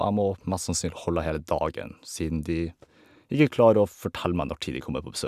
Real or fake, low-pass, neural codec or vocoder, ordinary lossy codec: real; 14.4 kHz; none; none